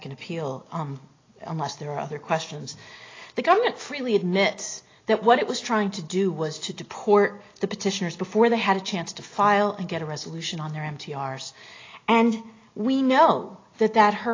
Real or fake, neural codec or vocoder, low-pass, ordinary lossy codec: real; none; 7.2 kHz; AAC, 32 kbps